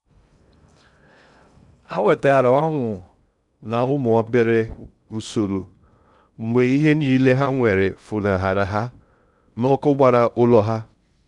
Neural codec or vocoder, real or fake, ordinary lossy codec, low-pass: codec, 16 kHz in and 24 kHz out, 0.6 kbps, FocalCodec, streaming, 2048 codes; fake; none; 10.8 kHz